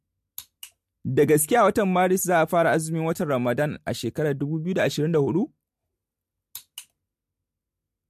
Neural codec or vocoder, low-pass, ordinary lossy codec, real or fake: none; 14.4 kHz; MP3, 64 kbps; real